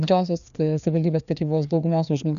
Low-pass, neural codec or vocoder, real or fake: 7.2 kHz; codec, 16 kHz, 2 kbps, FreqCodec, larger model; fake